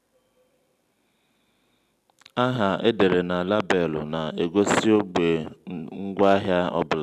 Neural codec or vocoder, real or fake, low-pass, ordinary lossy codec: none; real; 14.4 kHz; none